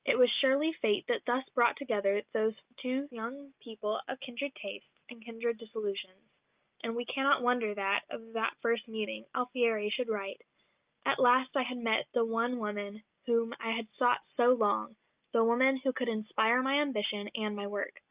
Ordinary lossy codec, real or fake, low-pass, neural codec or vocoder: Opus, 24 kbps; real; 3.6 kHz; none